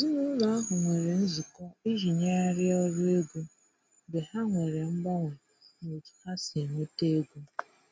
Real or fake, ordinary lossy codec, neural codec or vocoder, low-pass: real; none; none; none